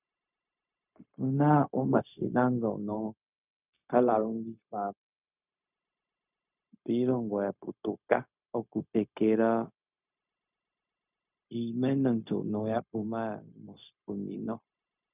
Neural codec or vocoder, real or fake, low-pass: codec, 16 kHz, 0.4 kbps, LongCat-Audio-Codec; fake; 3.6 kHz